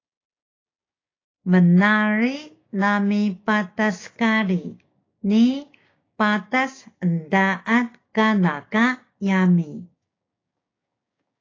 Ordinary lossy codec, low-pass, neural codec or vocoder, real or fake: AAC, 32 kbps; 7.2 kHz; codec, 16 kHz, 6 kbps, DAC; fake